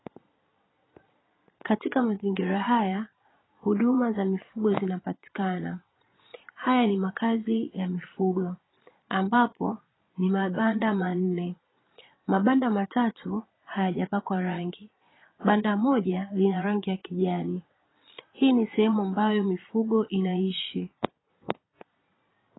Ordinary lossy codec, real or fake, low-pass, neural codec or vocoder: AAC, 16 kbps; fake; 7.2 kHz; vocoder, 22.05 kHz, 80 mel bands, Vocos